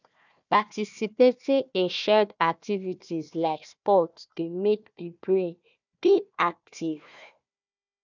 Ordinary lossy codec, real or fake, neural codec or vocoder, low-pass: none; fake; codec, 16 kHz, 1 kbps, FunCodec, trained on Chinese and English, 50 frames a second; 7.2 kHz